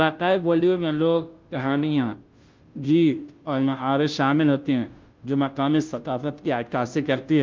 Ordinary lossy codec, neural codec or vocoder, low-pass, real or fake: Opus, 32 kbps; codec, 16 kHz, 0.5 kbps, FunCodec, trained on Chinese and English, 25 frames a second; 7.2 kHz; fake